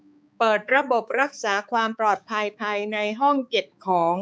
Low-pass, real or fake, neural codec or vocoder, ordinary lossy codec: none; fake; codec, 16 kHz, 4 kbps, X-Codec, HuBERT features, trained on balanced general audio; none